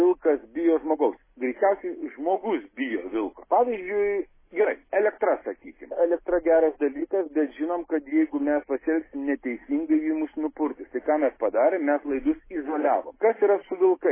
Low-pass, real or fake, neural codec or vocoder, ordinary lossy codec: 3.6 kHz; real; none; MP3, 16 kbps